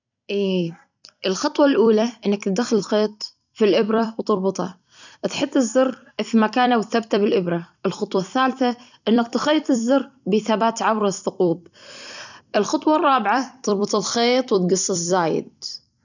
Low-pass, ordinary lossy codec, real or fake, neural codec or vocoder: 7.2 kHz; none; fake; vocoder, 44.1 kHz, 128 mel bands every 256 samples, BigVGAN v2